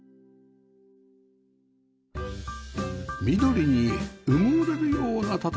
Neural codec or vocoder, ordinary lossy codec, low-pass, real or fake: none; none; none; real